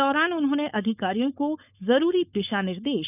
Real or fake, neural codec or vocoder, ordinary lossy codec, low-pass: fake; codec, 16 kHz, 4.8 kbps, FACodec; none; 3.6 kHz